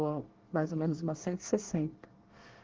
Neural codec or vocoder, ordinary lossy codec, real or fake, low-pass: codec, 24 kHz, 1 kbps, SNAC; Opus, 16 kbps; fake; 7.2 kHz